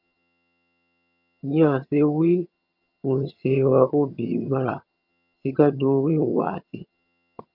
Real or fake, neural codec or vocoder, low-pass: fake; vocoder, 22.05 kHz, 80 mel bands, HiFi-GAN; 5.4 kHz